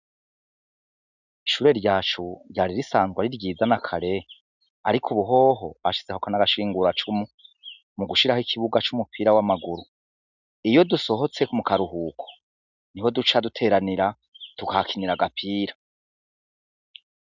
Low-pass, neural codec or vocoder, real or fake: 7.2 kHz; none; real